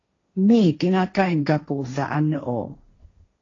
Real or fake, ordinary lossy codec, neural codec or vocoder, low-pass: fake; AAC, 32 kbps; codec, 16 kHz, 1.1 kbps, Voila-Tokenizer; 7.2 kHz